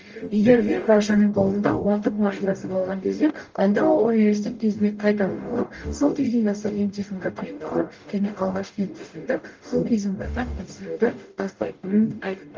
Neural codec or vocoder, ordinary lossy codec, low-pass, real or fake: codec, 44.1 kHz, 0.9 kbps, DAC; Opus, 24 kbps; 7.2 kHz; fake